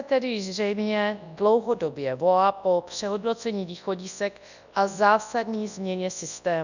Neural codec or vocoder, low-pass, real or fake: codec, 24 kHz, 0.9 kbps, WavTokenizer, large speech release; 7.2 kHz; fake